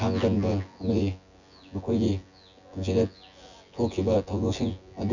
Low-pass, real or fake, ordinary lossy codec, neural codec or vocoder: 7.2 kHz; fake; none; vocoder, 24 kHz, 100 mel bands, Vocos